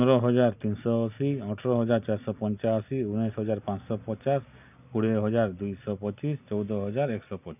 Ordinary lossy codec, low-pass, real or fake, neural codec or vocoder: none; 3.6 kHz; real; none